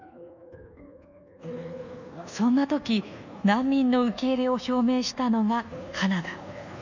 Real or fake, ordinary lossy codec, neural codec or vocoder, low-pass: fake; none; codec, 24 kHz, 1.2 kbps, DualCodec; 7.2 kHz